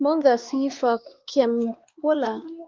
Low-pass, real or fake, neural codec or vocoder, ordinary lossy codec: 7.2 kHz; fake; codec, 16 kHz, 2 kbps, X-Codec, WavLM features, trained on Multilingual LibriSpeech; Opus, 32 kbps